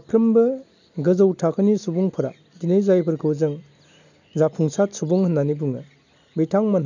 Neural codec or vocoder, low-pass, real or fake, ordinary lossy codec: none; 7.2 kHz; real; none